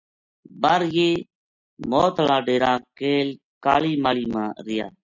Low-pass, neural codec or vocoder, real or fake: 7.2 kHz; none; real